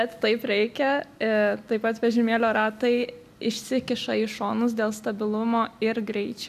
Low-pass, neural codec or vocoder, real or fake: 14.4 kHz; none; real